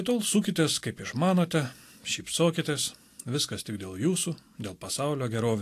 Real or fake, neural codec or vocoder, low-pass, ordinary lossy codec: real; none; 14.4 kHz; AAC, 64 kbps